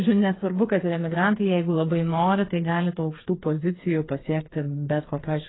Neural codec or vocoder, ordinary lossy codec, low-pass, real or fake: codec, 16 kHz, 4 kbps, FreqCodec, smaller model; AAC, 16 kbps; 7.2 kHz; fake